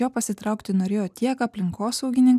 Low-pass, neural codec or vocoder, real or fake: 14.4 kHz; none; real